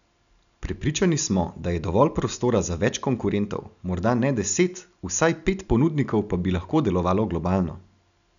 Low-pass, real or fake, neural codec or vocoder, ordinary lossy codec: 7.2 kHz; real; none; none